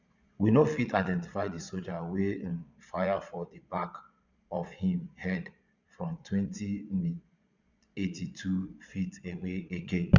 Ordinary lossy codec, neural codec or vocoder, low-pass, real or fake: none; vocoder, 22.05 kHz, 80 mel bands, Vocos; 7.2 kHz; fake